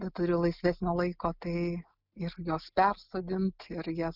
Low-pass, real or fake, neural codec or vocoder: 5.4 kHz; real; none